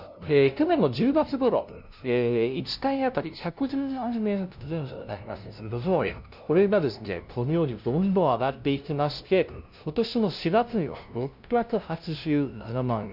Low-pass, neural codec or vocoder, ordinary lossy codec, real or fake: 5.4 kHz; codec, 16 kHz, 0.5 kbps, FunCodec, trained on LibriTTS, 25 frames a second; none; fake